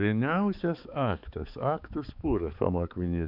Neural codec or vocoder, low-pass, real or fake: codec, 16 kHz, 4 kbps, X-Codec, HuBERT features, trained on balanced general audio; 5.4 kHz; fake